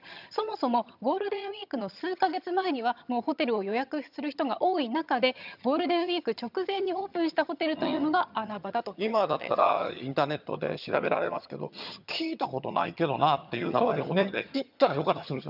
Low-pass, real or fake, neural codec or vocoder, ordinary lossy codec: 5.4 kHz; fake; vocoder, 22.05 kHz, 80 mel bands, HiFi-GAN; none